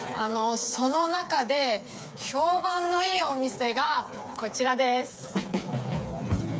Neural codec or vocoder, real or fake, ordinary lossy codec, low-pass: codec, 16 kHz, 4 kbps, FreqCodec, smaller model; fake; none; none